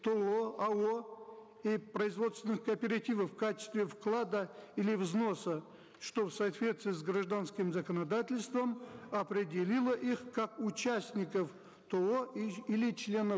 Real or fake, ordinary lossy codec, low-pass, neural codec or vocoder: real; none; none; none